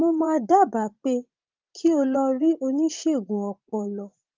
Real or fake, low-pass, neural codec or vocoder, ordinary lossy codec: fake; 7.2 kHz; vocoder, 44.1 kHz, 80 mel bands, Vocos; Opus, 24 kbps